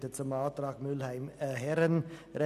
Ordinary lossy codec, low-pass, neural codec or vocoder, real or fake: none; 14.4 kHz; none; real